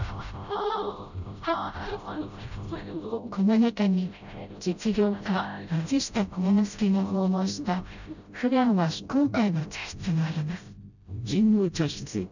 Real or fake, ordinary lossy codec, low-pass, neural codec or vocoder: fake; none; 7.2 kHz; codec, 16 kHz, 0.5 kbps, FreqCodec, smaller model